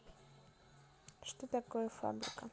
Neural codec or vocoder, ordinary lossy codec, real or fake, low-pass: none; none; real; none